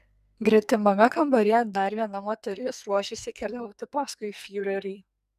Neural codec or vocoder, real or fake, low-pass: codec, 44.1 kHz, 2.6 kbps, SNAC; fake; 14.4 kHz